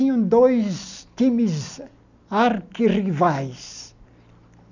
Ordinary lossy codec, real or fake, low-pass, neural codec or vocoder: none; real; 7.2 kHz; none